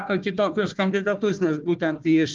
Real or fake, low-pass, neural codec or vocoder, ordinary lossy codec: fake; 7.2 kHz; codec, 16 kHz, 1 kbps, FunCodec, trained on Chinese and English, 50 frames a second; Opus, 32 kbps